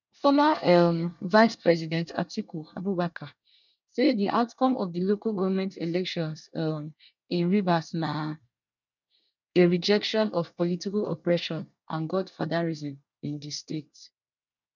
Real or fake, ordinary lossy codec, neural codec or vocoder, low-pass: fake; none; codec, 24 kHz, 1 kbps, SNAC; 7.2 kHz